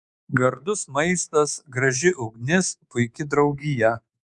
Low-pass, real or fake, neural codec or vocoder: 10.8 kHz; fake; codec, 24 kHz, 3.1 kbps, DualCodec